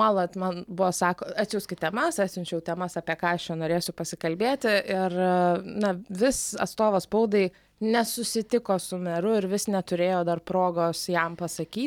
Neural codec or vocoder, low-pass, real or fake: none; 19.8 kHz; real